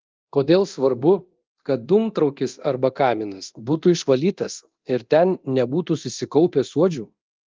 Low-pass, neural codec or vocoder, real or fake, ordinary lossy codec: 7.2 kHz; codec, 24 kHz, 0.9 kbps, DualCodec; fake; Opus, 32 kbps